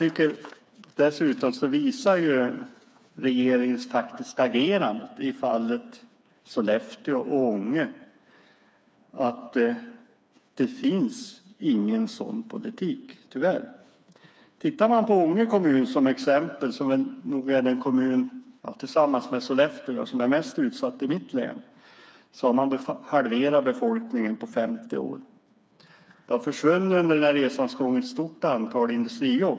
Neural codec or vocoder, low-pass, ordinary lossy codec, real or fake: codec, 16 kHz, 4 kbps, FreqCodec, smaller model; none; none; fake